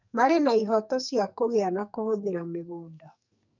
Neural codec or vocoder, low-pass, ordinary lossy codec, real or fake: codec, 44.1 kHz, 2.6 kbps, SNAC; 7.2 kHz; none; fake